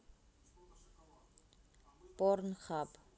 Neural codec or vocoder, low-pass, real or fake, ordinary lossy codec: none; none; real; none